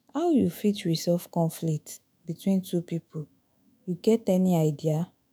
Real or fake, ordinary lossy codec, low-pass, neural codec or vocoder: fake; none; none; autoencoder, 48 kHz, 128 numbers a frame, DAC-VAE, trained on Japanese speech